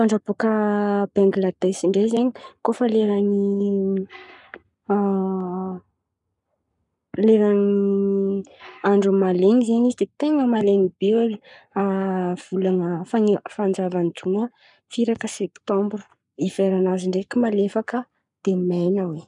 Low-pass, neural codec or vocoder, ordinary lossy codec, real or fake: 10.8 kHz; codec, 44.1 kHz, 7.8 kbps, Pupu-Codec; none; fake